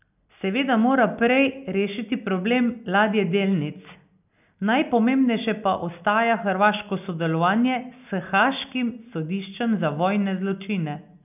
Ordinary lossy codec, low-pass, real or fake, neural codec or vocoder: none; 3.6 kHz; real; none